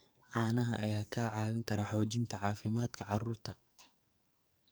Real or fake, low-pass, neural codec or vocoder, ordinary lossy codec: fake; none; codec, 44.1 kHz, 2.6 kbps, SNAC; none